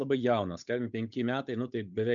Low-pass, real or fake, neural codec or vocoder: 7.2 kHz; fake; codec, 16 kHz, 8 kbps, FunCodec, trained on Chinese and English, 25 frames a second